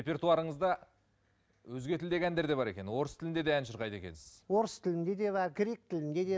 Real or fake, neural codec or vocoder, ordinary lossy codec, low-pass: real; none; none; none